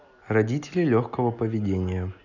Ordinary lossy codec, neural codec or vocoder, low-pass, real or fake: none; none; 7.2 kHz; real